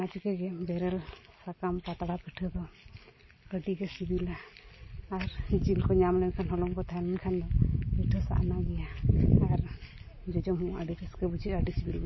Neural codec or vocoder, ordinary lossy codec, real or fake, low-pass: none; MP3, 24 kbps; real; 7.2 kHz